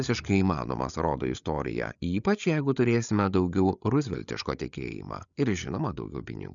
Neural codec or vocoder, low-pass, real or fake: codec, 16 kHz, 8 kbps, FreqCodec, larger model; 7.2 kHz; fake